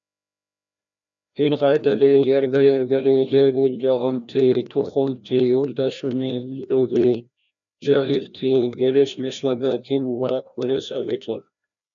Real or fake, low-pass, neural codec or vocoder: fake; 7.2 kHz; codec, 16 kHz, 1 kbps, FreqCodec, larger model